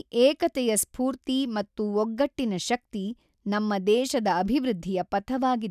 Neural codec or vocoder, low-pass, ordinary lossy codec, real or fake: none; 14.4 kHz; none; real